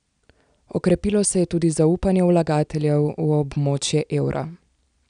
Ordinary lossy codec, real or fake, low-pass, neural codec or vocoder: none; real; 9.9 kHz; none